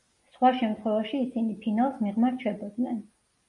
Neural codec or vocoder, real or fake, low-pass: none; real; 10.8 kHz